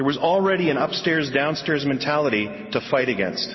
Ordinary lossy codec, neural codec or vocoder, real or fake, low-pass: MP3, 24 kbps; none; real; 7.2 kHz